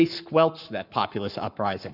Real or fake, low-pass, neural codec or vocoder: fake; 5.4 kHz; codec, 44.1 kHz, 7.8 kbps, Pupu-Codec